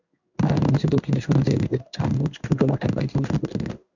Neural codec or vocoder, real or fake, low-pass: codec, 16 kHz in and 24 kHz out, 1 kbps, XY-Tokenizer; fake; 7.2 kHz